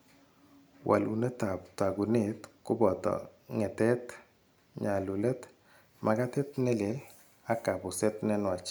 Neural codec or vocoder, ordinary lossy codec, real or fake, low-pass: none; none; real; none